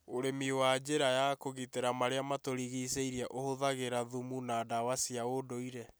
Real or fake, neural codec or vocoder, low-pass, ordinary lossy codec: real; none; none; none